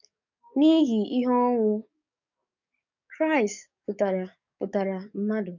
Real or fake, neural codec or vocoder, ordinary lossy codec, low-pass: fake; codec, 44.1 kHz, 7.8 kbps, DAC; none; 7.2 kHz